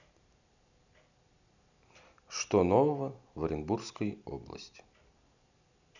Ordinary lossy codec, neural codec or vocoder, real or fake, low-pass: none; none; real; 7.2 kHz